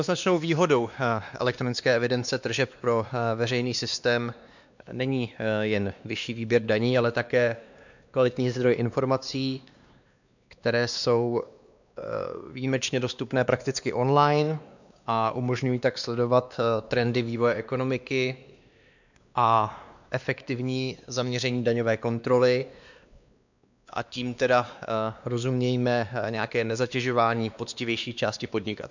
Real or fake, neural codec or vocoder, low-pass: fake; codec, 16 kHz, 2 kbps, X-Codec, WavLM features, trained on Multilingual LibriSpeech; 7.2 kHz